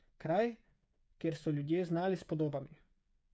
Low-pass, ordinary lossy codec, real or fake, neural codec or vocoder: none; none; fake; codec, 16 kHz, 8 kbps, FreqCodec, smaller model